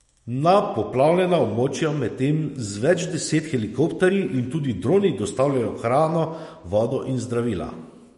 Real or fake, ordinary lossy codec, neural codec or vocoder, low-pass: fake; MP3, 48 kbps; autoencoder, 48 kHz, 128 numbers a frame, DAC-VAE, trained on Japanese speech; 19.8 kHz